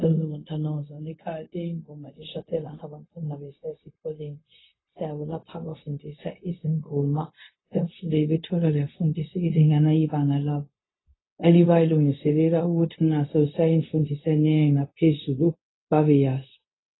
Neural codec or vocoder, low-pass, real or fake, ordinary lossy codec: codec, 16 kHz, 0.4 kbps, LongCat-Audio-Codec; 7.2 kHz; fake; AAC, 16 kbps